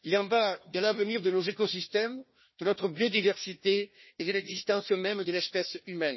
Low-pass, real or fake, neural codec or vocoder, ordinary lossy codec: 7.2 kHz; fake; codec, 16 kHz, 1 kbps, FunCodec, trained on Chinese and English, 50 frames a second; MP3, 24 kbps